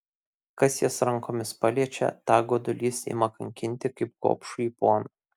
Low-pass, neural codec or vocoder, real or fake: 19.8 kHz; none; real